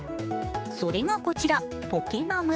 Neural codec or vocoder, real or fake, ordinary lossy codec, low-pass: codec, 16 kHz, 4 kbps, X-Codec, HuBERT features, trained on general audio; fake; none; none